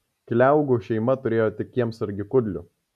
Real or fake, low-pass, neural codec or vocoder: real; 14.4 kHz; none